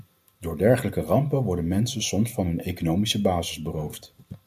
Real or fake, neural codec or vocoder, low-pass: real; none; 14.4 kHz